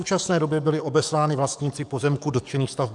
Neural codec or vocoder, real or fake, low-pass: codec, 44.1 kHz, 7.8 kbps, DAC; fake; 10.8 kHz